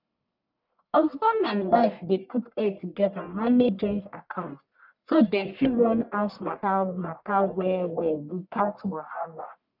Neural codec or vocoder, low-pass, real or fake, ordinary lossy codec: codec, 44.1 kHz, 1.7 kbps, Pupu-Codec; 5.4 kHz; fake; none